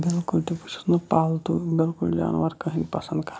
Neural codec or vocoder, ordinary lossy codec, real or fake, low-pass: none; none; real; none